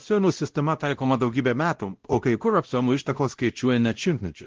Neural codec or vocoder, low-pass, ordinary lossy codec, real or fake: codec, 16 kHz, 0.5 kbps, X-Codec, WavLM features, trained on Multilingual LibriSpeech; 7.2 kHz; Opus, 16 kbps; fake